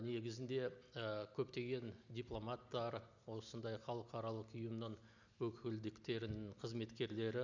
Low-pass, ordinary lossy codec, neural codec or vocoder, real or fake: 7.2 kHz; none; none; real